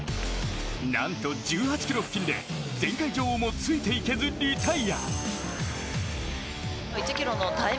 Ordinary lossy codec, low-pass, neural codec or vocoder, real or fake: none; none; none; real